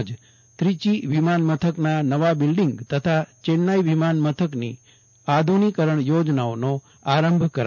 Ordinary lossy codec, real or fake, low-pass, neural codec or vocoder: none; real; 7.2 kHz; none